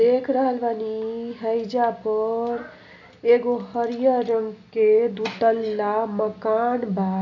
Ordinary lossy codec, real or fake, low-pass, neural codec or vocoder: MP3, 64 kbps; real; 7.2 kHz; none